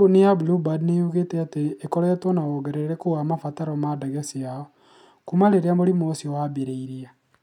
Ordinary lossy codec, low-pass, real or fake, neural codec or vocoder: none; 19.8 kHz; real; none